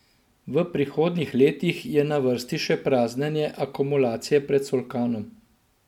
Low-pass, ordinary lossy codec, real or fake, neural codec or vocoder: 19.8 kHz; MP3, 96 kbps; real; none